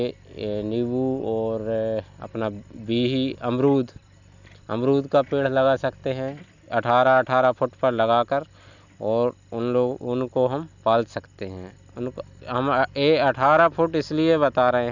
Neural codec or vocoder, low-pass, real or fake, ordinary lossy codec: none; 7.2 kHz; real; none